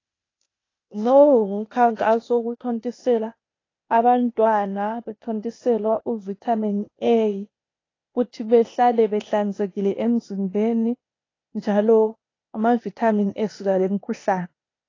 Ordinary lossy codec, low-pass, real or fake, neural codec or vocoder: AAC, 32 kbps; 7.2 kHz; fake; codec, 16 kHz, 0.8 kbps, ZipCodec